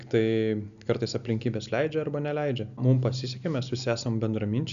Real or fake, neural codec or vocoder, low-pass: real; none; 7.2 kHz